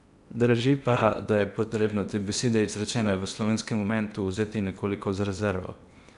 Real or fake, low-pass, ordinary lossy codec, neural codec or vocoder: fake; 10.8 kHz; none; codec, 16 kHz in and 24 kHz out, 0.8 kbps, FocalCodec, streaming, 65536 codes